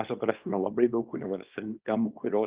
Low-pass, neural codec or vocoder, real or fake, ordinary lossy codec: 3.6 kHz; codec, 24 kHz, 0.9 kbps, WavTokenizer, small release; fake; Opus, 32 kbps